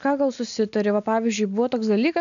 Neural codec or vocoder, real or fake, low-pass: none; real; 7.2 kHz